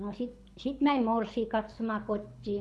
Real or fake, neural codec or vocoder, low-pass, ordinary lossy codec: fake; codec, 24 kHz, 6 kbps, HILCodec; none; none